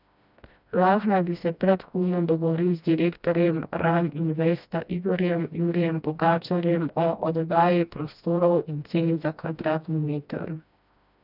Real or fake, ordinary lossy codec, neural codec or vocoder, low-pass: fake; none; codec, 16 kHz, 1 kbps, FreqCodec, smaller model; 5.4 kHz